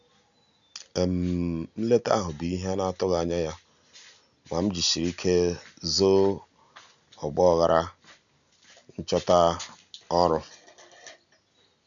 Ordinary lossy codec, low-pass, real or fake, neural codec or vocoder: none; 7.2 kHz; real; none